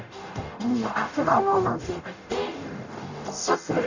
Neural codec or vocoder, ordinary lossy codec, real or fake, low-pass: codec, 44.1 kHz, 0.9 kbps, DAC; none; fake; 7.2 kHz